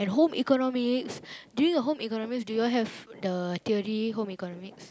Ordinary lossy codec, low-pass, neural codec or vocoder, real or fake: none; none; none; real